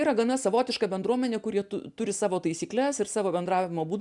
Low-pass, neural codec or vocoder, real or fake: 10.8 kHz; none; real